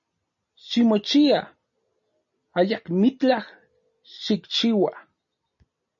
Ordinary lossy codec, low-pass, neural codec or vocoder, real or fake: MP3, 32 kbps; 7.2 kHz; none; real